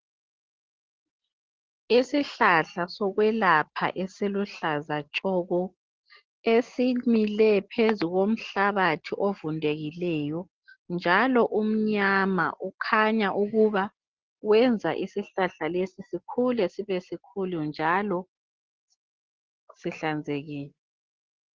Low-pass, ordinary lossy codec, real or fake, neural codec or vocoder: 7.2 kHz; Opus, 16 kbps; real; none